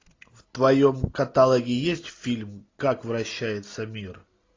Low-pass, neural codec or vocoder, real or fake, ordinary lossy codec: 7.2 kHz; none; real; AAC, 32 kbps